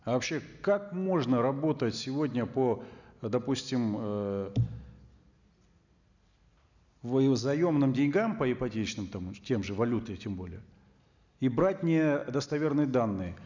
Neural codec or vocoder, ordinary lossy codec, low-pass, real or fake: none; none; 7.2 kHz; real